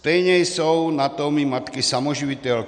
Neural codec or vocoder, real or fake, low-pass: none; real; 9.9 kHz